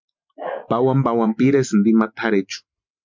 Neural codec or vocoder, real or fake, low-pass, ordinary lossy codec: none; real; 7.2 kHz; MP3, 64 kbps